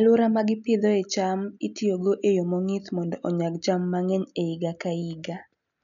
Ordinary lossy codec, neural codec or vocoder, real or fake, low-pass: MP3, 96 kbps; none; real; 7.2 kHz